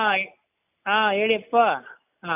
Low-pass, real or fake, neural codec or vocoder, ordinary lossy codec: 3.6 kHz; real; none; none